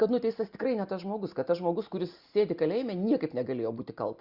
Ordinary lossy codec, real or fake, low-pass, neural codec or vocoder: Opus, 64 kbps; real; 5.4 kHz; none